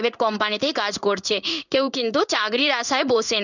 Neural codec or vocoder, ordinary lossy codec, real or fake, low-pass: codec, 16 kHz in and 24 kHz out, 1 kbps, XY-Tokenizer; none; fake; 7.2 kHz